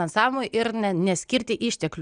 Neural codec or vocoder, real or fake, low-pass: none; real; 9.9 kHz